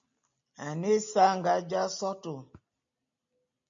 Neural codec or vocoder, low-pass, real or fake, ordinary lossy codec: none; 7.2 kHz; real; MP3, 48 kbps